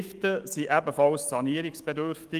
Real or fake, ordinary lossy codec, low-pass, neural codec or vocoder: fake; Opus, 24 kbps; 14.4 kHz; autoencoder, 48 kHz, 128 numbers a frame, DAC-VAE, trained on Japanese speech